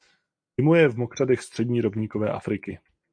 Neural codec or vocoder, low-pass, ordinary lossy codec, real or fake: none; 9.9 kHz; AAC, 64 kbps; real